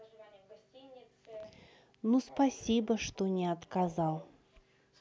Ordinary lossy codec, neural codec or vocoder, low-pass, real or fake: none; none; none; real